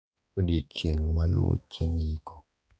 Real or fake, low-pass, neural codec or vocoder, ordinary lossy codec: fake; none; codec, 16 kHz, 2 kbps, X-Codec, HuBERT features, trained on balanced general audio; none